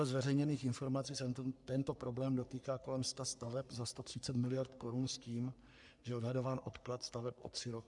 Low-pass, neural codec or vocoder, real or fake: 10.8 kHz; codec, 44.1 kHz, 3.4 kbps, Pupu-Codec; fake